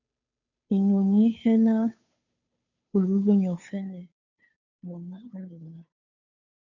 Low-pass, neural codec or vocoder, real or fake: 7.2 kHz; codec, 16 kHz, 2 kbps, FunCodec, trained on Chinese and English, 25 frames a second; fake